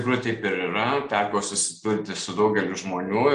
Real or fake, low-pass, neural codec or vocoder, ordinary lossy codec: real; 10.8 kHz; none; Opus, 32 kbps